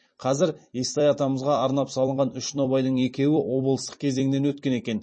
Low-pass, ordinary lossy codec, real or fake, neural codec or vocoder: 9.9 kHz; MP3, 32 kbps; fake; vocoder, 44.1 kHz, 128 mel bands every 256 samples, BigVGAN v2